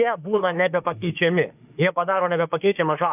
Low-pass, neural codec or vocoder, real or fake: 3.6 kHz; autoencoder, 48 kHz, 32 numbers a frame, DAC-VAE, trained on Japanese speech; fake